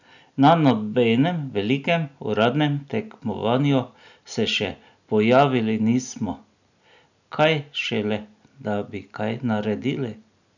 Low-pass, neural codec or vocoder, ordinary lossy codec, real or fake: 7.2 kHz; none; none; real